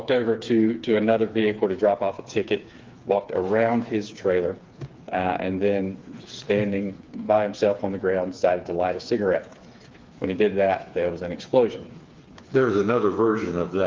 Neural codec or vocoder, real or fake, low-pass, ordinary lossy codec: codec, 16 kHz, 4 kbps, FreqCodec, smaller model; fake; 7.2 kHz; Opus, 32 kbps